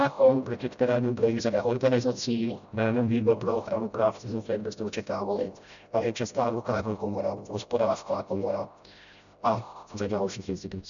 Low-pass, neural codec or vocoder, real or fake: 7.2 kHz; codec, 16 kHz, 0.5 kbps, FreqCodec, smaller model; fake